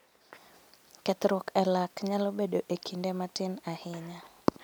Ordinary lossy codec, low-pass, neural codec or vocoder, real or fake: none; none; none; real